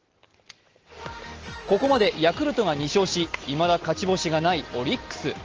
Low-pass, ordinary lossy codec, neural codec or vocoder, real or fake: 7.2 kHz; Opus, 16 kbps; none; real